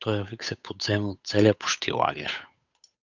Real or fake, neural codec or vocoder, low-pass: fake; codec, 16 kHz, 8 kbps, FunCodec, trained on Chinese and English, 25 frames a second; 7.2 kHz